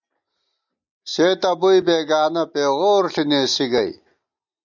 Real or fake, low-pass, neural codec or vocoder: real; 7.2 kHz; none